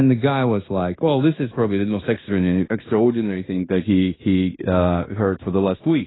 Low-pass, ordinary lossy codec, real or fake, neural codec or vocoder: 7.2 kHz; AAC, 16 kbps; fake; codec, 16 kHz in and 24 kHz out, 0.9 kbps, LongCat-Audio-Codec, fine tuned four codebook decoder